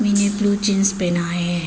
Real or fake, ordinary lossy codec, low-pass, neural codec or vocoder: real; none; none; none